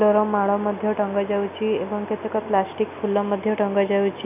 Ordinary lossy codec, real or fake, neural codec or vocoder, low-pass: none; real; none; 3.6 kHz